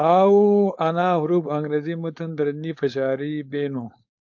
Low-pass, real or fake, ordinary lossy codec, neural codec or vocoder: 7.2 kHz; fake; AAC, 48 kbps; codec, 16 kHz, 4.8 kbps, FACodec